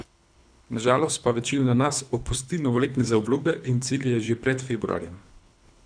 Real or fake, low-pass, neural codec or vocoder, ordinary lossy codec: fake; 9.9 kHz; codec, 24 kHz, 3 kbps, HILCodec; none